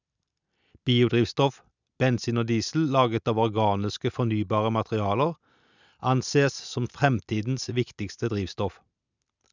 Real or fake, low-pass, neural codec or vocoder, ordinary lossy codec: real; 7.2 kHz; none; none